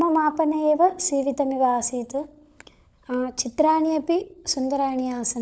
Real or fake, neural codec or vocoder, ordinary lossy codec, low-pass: fake; codec, 16 kHz, 16 kbps, FunCodec, trained on LibriTTS, 50 frames a second; none; none